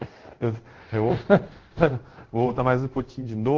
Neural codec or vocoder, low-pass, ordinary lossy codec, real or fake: codec, 24 kHz, 0.5 kbps, DualCodec; 7.2 kHz; Opus, 16 kbps; fake